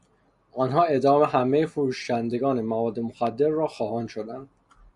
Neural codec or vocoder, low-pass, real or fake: none; 10.8 kHz; real